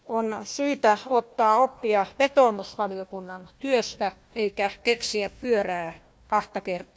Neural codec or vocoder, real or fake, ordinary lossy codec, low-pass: codec, 16 kHz, 1 kbps, FunCodec, trained on Chinese and English, 50 frames a second; fake; none; none